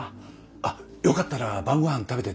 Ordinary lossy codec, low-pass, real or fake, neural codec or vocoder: none; none; real; none